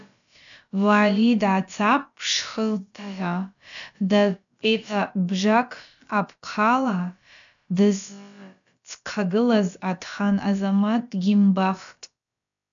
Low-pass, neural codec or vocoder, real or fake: 7.2 kHz; codec, 16 kHz, about 1 kbps, DyCAST, with the encoder's durations; fake